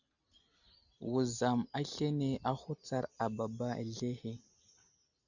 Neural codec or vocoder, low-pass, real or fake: none; 7.2 kHz; real